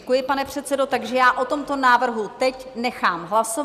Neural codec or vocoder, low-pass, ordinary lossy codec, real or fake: none; 14.4 kHz; Opus, 64 kbps; real